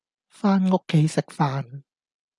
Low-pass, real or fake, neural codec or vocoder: 10.8 kHz; real; none